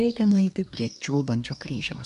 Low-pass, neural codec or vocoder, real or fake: 10.8 kHz; codec, 24 kHz, 1 kbps, SNAC; fake